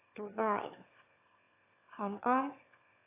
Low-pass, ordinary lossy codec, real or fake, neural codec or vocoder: 3.6 kHz; AAC, 24 kbps; fake; autoencoder, 22.05 kHz, a latent of 192 numbers a frame, VITS, trained on one speaker